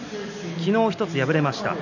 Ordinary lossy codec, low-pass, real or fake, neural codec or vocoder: none; 7.2 kHz; real; none